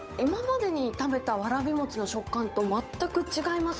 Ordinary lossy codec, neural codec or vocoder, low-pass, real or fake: none; codec, 16 kHz, 8 kbps, FunCodec, trained on Chinese and English, 25 frames a second; none; fake